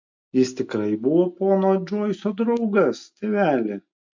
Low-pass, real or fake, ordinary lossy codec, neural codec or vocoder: 7.2 kHz; real; MP3, 48 kbps; none